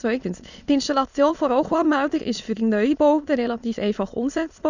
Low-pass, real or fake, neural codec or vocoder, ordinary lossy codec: 7.2 kHz; fake; autoencoder, 22.05 kHz, a latent of 192 numbers a frame, VITS, trained on many speakers; none